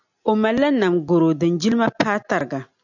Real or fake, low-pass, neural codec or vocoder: real; 7.2 kHz; none